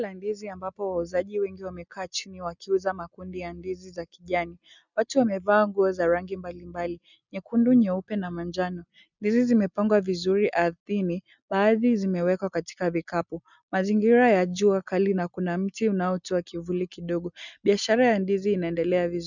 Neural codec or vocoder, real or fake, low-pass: none; real; 7.2 kHz